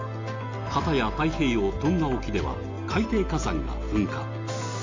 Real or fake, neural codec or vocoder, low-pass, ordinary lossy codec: real; none; 7.2 kHz; MP3, 64 kbps